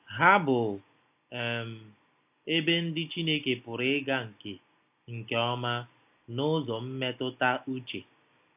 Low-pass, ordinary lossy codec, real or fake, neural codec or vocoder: 3.6 kHz; AAC, 32 kbps; real; none